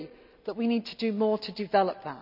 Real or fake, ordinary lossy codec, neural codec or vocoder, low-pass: real; none; none; 5.4 kHz